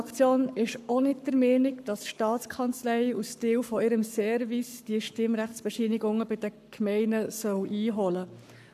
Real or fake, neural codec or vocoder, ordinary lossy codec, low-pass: fake; codec, 44.1 kHz, 7.8 kbps, Pupu-Codec; none; 14.4 kHz